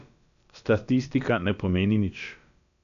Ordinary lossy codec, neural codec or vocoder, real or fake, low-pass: none; codec, 16 kHz, about 1 kbps, DyCAST, with the encoder's durations; fake; 7.2 kHz